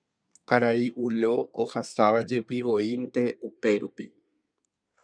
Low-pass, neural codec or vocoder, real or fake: 9.9 kHz; codec, 24 kHz, 1 kbps, SNAC; fake